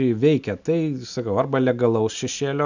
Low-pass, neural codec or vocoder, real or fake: 7.2 kHz; none; real